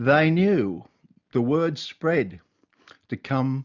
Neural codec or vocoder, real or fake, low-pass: none; real; 7.2 kHz